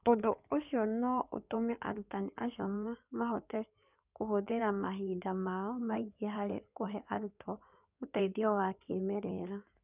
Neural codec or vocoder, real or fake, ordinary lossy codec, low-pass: codec, 16 kHz in and 24 kHz out, 2.2 kbps, FireRedTTS-2 codec; fake; none; 3.6 kHz